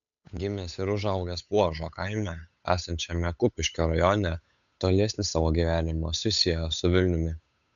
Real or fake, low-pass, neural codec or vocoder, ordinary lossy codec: fake; 7.2 kHz; codec, 16 kHz, 8 kbps, FunCodec, trained on Chinese and English, 25 frames a second; MP3, 96 kbps